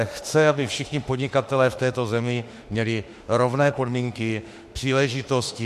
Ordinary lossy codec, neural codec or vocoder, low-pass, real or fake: MP3, 64 kbps; autoencoder, 48 kHz, 32 numbers a frame, DAC-VAE, trained on Japanese speech; 14.4 kHz; fake